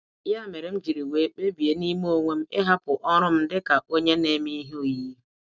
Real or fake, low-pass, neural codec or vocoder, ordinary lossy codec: real; none; none; none